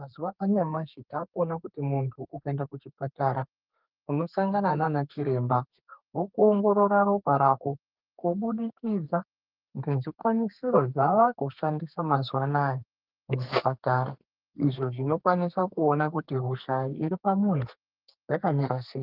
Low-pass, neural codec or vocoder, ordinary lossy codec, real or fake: 5.4 kHz; codec, 32 kHz, 1.9 kbps, SNAC; Opus, 32 kbps; fake